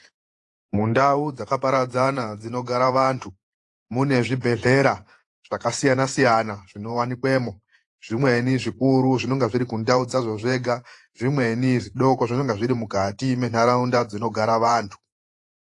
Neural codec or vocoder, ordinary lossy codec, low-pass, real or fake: vocoder, 48 kHz, 128 mel bands, Vocos; AAC, 48 kbps; 10.8 kHz; fake